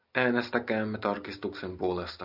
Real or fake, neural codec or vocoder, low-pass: real; none; 5.4 kHz